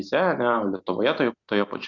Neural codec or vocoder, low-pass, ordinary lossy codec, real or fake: none; 7.2 kHz; Opus, 64 kbps; real